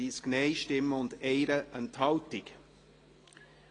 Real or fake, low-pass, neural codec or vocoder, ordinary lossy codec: real; 9.9 kHz; none; AAC, 32 kbps